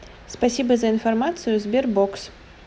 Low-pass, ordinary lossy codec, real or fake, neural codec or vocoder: none; none; real; none